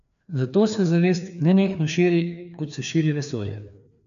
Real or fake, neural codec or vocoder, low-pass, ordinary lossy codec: fake; codec, 16 kHz, 2 kbps, FreqCodec, larger model; 7.2 kHz; none